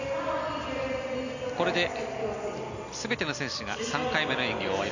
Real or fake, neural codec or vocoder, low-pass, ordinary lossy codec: real; none; 7.2 kHz; none